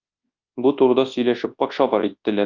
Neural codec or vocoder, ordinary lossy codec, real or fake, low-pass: codec, 24 kHz, 0.9 kbps, WavTokenizer, large speech release; Opus, 24 kbps; fake; 7.2 kHz